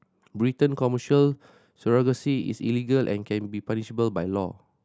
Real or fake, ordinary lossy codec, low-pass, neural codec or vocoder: real; none; none; none